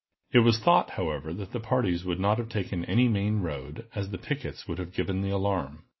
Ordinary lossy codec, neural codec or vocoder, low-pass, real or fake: MP3, 24 kbps; none; 7.2 kHz; real